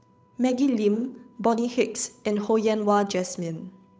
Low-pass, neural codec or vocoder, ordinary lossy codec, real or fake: none; codec, 16 kHz, 8 kbps, FunCodec, trained on Chinese and English, 25 frames a second; none; fake